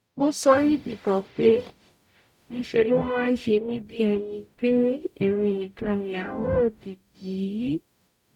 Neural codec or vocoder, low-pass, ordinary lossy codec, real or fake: codec, 44.1 kHz, 0.9 kbps, DAC; 19.8 kHz; none; fake